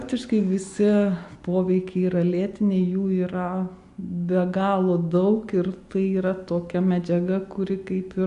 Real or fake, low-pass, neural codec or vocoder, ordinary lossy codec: real; 10.8 kHz; none; AAC, 64 kbps